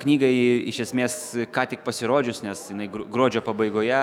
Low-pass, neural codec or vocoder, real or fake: 19.8 kHz; autoencoder, 48 kHz, 128 numbers a frame, DAC-VAE, trained on Japanese speech; fake